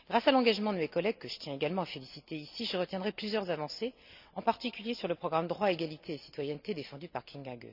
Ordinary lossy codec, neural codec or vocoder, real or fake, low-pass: none; none; real; 5.4 kHz